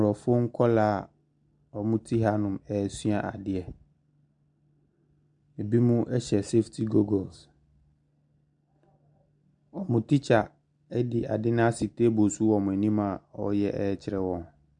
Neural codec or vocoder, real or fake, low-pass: none; real; 9.9 kHz